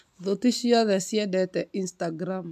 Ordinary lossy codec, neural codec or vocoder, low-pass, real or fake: MP3, 96 kbps; none; 14.4 kHz; real